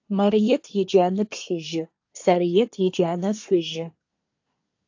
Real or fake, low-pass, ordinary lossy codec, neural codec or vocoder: fake; 7.2 kHz; AAC, 48 kbps; codec, 24 kHz, 1 kbps, SNAC